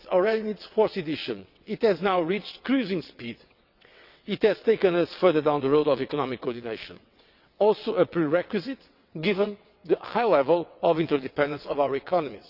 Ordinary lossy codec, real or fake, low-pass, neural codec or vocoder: Opus, 64 kbps; fake; 5.4 kHz; vocoder, 22.05 kHz, 80 mel bands, WaveNeXt